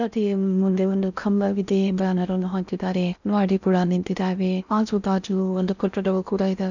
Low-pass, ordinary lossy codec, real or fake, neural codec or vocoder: 7.2 kHz; none; fake; codec, 16 kHz in and 24 kHz out, 0.6 kbps, FocalCodec, streaming, 4096 codes